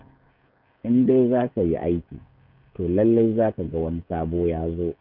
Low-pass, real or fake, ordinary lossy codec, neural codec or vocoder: 5.4 kHz; fake; none; codec, 16 kHz, 16 kbps, FreqCodec, smaller model